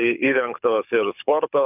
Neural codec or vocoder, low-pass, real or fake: codec, 24 kHz, 6 kbps, HILCodec; 3.6 kHz; fake